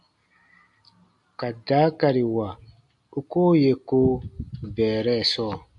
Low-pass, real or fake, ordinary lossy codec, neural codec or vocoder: 10.8 kHz; real; MP3, 64 kbps; none